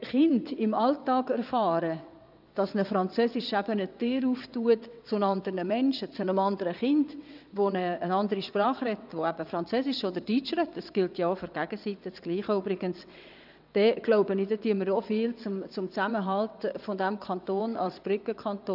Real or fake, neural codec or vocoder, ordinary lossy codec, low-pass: fake; vocoder, 22.05 kHz, 80 mel bands, WaveNeXt; none; 5.4 kHz